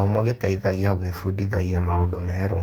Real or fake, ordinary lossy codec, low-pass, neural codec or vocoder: fake; Opus, 64 kbps; 19.8 kHz; codec, 44.1 kHz, 2.6 kbps, DAC